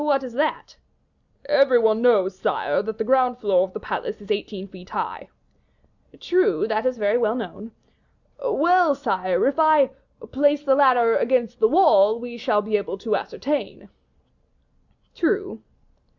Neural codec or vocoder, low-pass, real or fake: none; 7.2 kHz; real